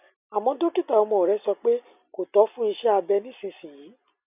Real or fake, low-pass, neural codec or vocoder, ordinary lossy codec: real; 3.6 kHz; none; none